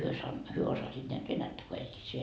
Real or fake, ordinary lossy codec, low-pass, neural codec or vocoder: real; none; none; none